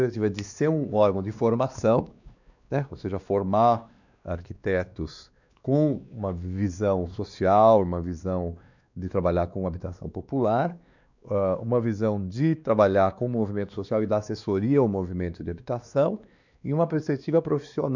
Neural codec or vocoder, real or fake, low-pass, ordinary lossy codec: codec, 16 kHz, 2 kbps, X-Codec, WavLM features, trained on Multilingual LibriSpeech; fake; 7.2 kHz; none